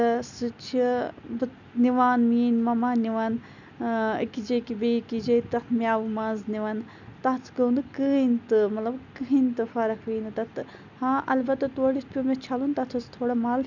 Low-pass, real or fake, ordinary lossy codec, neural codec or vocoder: 7.2 kHz; real; none; none